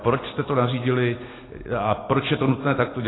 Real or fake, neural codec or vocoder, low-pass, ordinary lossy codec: real; none; 7.2 kHz; AAC, 16 kbps